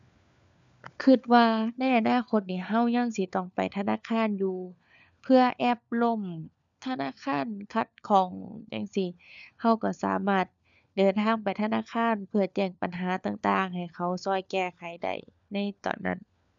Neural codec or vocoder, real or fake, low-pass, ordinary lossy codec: codec, 16 kHz, 4 kbps, FunCodec, trained on LibriTTS, 50 frames a second; fake; 7.2 kHz; MP3, 96 kbps